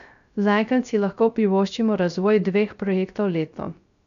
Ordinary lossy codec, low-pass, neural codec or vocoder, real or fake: none; 7.2 kHz; codec, 16 kHz, 0.3 kbps, FocalCodec; fake